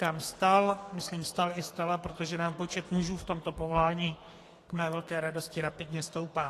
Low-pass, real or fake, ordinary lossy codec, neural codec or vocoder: 14.4 kHz; fake; AAC, 48 kbps; codec, 32 kHz, 1.9 kbps, SNAC